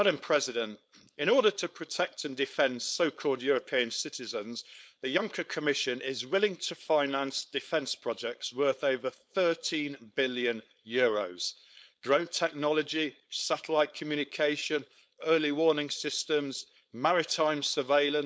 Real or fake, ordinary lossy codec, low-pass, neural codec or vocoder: fake; none; none; codec, 16 kHz, 4.8 kbps, FACodec